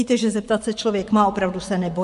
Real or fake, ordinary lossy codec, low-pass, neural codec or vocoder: fake; AAC, 64 kbps; 10.8 kHz; vocoder, 24 kHz, 100 mel bands, Vocos